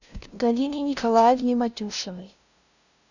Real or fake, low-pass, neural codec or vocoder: fake; 7.2 kHz; codec, 16 kHz, 0.5 kbps, FunCodec, trained on LibriTTS, 25 frames a second